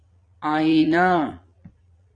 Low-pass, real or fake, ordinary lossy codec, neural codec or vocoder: 9.9 kHz; fake; AAC, 48 kbps; vocoder, 22.05 kHz, 80 mel bands, Vocos